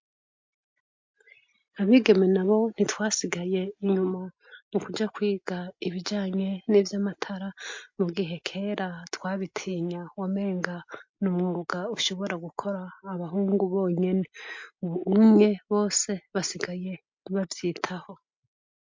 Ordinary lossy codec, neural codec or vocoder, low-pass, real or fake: MP3, 48 kbps; none; 7.2 kHz; real